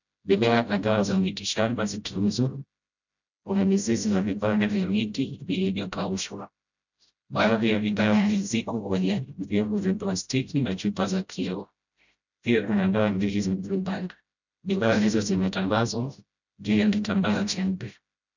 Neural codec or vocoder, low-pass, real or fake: codec, 16 kHz, 0.5 kbps, FreqCodec, smaller model; 7.2 kHz; fake